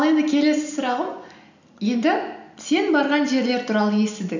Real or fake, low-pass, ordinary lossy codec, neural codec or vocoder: real; 7.2 kHz; none; none